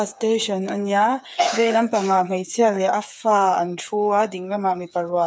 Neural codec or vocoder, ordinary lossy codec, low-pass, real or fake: codec, 16 kHz, 8 kbps, FreqCodec, smaller model; none; none; fake